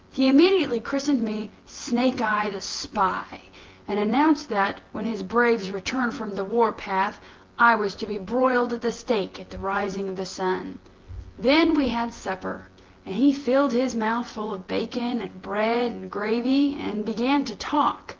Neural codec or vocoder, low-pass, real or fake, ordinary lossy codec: vocoder, 24 kHz, 100 mel bands, Vocos; 7.2 kHz; fake; Opus, 16 kbps